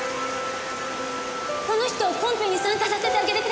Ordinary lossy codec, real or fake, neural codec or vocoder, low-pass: none; real; none; none